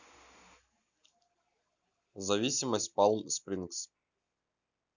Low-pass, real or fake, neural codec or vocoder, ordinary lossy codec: 7.2 kHz; real; none; none